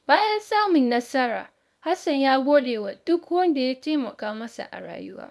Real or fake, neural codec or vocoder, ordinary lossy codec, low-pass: fake; codec, 24 kHz, 0.9 kbps, WavTokenizer, small release; none; none